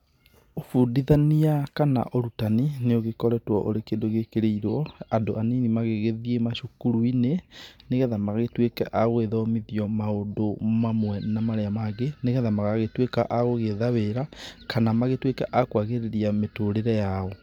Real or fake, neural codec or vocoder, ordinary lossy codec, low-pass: real; none; none; 19.8 kHz